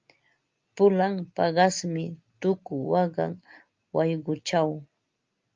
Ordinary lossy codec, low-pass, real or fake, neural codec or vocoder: Opus, 24 kbps; 7.2 kHz; real; none